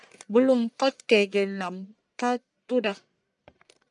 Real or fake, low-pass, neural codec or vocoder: fake; 10.8 kHz; codec, 44.1 kHz, 1.7 kbps, Pupu-Codec